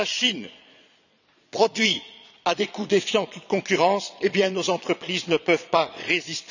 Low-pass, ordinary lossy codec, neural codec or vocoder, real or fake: 7.2 kHz; none; vocoder, 22.05 kHz, 80 mel bands, Vocos; fake